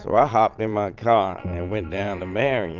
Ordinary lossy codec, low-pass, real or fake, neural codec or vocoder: Opus, 24 kbps; 7.2 kHz; fake; vocoder, 22.05 kHz, 80 mel bands, Vocos